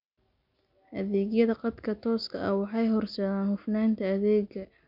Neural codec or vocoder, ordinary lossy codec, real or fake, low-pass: none; none; real; 5.4 kHz